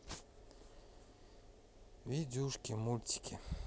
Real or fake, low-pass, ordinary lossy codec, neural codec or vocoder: real; none; none; none